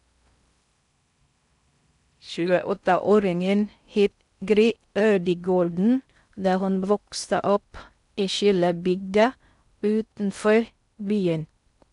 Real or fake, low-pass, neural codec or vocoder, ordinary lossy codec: fake; 10.8 kHz; codec, 16 kHz in and 24 kHz out, 0.6 kbps, FocalCodec, streaming, 4096 codes; none